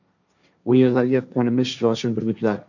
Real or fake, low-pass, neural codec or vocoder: fake; 7.2 kHz; codec, 16 kHz, 1.1 kbps, Voila-Tokenizer